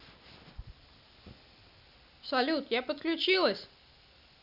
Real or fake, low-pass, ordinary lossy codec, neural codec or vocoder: real; 5.4 kHz; none; none